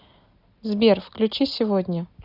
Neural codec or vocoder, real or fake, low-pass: none; real; 5.4 kHz